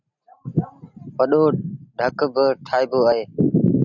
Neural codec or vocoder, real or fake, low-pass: none; real; 7.2 kHz